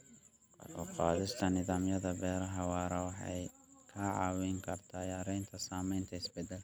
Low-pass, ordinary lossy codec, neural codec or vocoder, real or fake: none; none; none; real